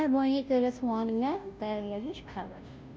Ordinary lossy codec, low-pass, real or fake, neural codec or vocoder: none; none; fake; codec, 16 kHz, 0.5 kbps, FunCodec, trained on Chinese and English, 25 frames a second